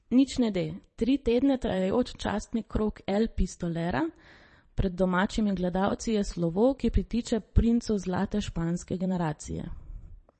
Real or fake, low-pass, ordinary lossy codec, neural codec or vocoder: fake; 9.9 kHz; MP3, 32 kbps; vocoder, 22.05 kHz, 80 mel bands, Vocos